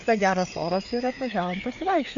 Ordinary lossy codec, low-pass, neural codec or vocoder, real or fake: MP3, 64 kbps; 7.2 kHz; codec, 16 kHz, 4 kbps, FreqCodec, larger model; fake